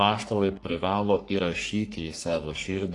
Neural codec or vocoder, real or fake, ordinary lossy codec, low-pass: codec, 44.1 kHz, 3.4 kbps, Pupu-Codec; fake; AAC, 48 kbps; 10.8 kHz